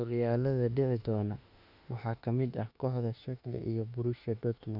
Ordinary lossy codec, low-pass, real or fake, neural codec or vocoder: none; 5.4 kHz; fake; autoencoder, 48 kHz, 32 numbers a frame, DAC-VAE, trained on Japanese speech